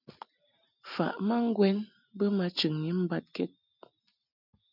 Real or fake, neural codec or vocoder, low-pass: real; none; 5.4 kHz